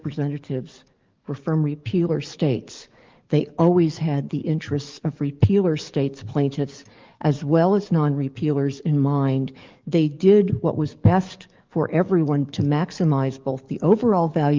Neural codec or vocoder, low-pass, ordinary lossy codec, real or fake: codec, 44.1 kHz, 7.8 kbps, DAC; 7.2 kHz; Opus, 32 kbps; fake